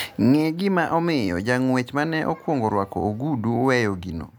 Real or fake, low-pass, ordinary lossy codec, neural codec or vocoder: real; none; none; none